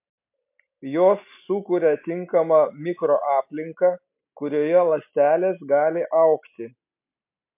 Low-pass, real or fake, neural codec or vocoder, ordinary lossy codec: 3.6 kHz; real; none; MP3, 32 kbps